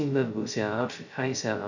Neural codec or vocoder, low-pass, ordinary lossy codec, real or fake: codec, 16 kHz, 0.2 kbps, FocalCodec; 7.2 kHz; none; fake